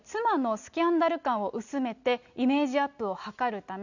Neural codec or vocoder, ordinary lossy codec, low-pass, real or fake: none; none; 7.2 kHz; real